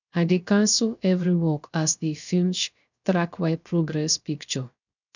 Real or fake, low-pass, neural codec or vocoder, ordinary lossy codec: fake; 7.2 kHz; codec, 16 kHz, 0.3 kbps, FocalCodec; none